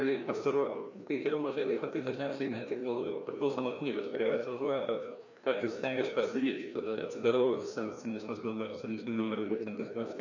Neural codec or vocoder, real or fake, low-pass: codec, 16 kHz, 1 kbps, FreqCodec, larger model; fake; 7.2 kHz